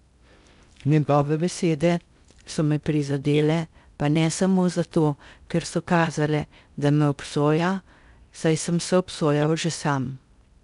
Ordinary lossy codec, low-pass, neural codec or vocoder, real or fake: none; 10.8 kHz; codec, 16 kHz in and 24 kHz out, 0.6 kbps, FocalCodec, streaming, 2048 codes; fake